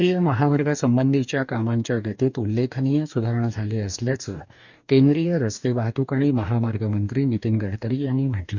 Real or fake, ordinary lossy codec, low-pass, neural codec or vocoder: fake; none; 7.2 kHz; codec, 44.1 kHz, 2.6 kbps, DAC